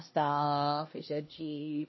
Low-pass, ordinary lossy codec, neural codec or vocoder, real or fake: 7.2 kHz; MP3, 24 kbps; codec, 16 kHz, 1 kbps, X-Codec, HuBERT features, trained on LibriSpeech; fake